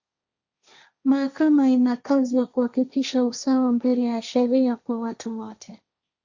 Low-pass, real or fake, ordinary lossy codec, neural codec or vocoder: 7.2 kHz; fake; Opus, 64 kbps; codec, 16 kHz, 1.1 kbps, Voila-Tokenizer